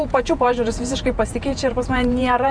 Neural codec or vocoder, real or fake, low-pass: vocoder, 48 kHz, 128 mel bands, Vocos; fake; 9.9 kHz